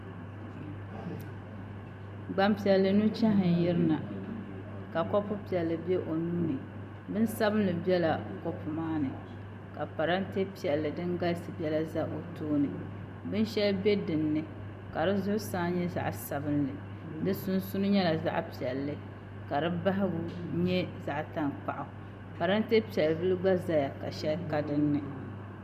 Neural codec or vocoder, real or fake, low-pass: none; real; 14.4 kHz